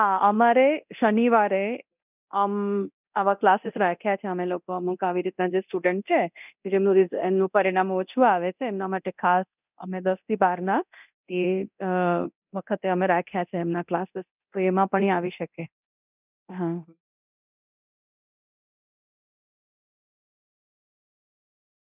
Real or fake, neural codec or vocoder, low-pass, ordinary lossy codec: fake; codec, 24 kHz, 0.9 kbps, DualCodec; 3.6 kHz; none